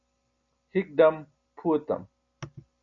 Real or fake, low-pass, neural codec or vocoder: real; 7.2 kHz; none